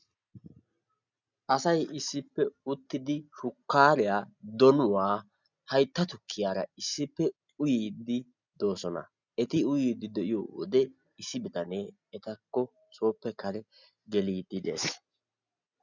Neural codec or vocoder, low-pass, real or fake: none; 7.2 kHz; real